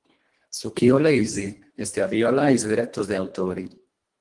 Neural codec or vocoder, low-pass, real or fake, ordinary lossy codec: codec, 24 kHz, 1.5 kbps, HILCodec; 10.8 kHz; fake; Opus, 24 kbps